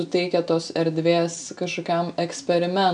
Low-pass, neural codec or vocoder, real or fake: 9.9 kHz; none; real